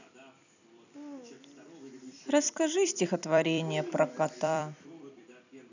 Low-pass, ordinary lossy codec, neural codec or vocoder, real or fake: 7.2 kHz; none; vocoder, 44.1 kHz, 128 mel bands every 256 samples, BigVGAN v2; fake